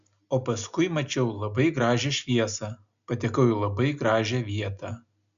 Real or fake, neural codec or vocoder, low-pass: real; none; 7.2 kHz